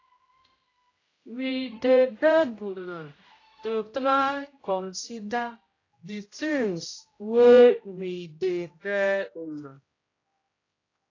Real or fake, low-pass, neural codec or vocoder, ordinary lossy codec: fake; 7.2 kHz; codec, 16 kHz, 0.5 kbps, X-Codec, HuBERT features, trained on general audio; AAC, 32 kbps